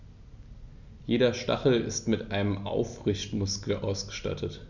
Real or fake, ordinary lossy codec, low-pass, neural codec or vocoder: real; none; 7.2 kHz; none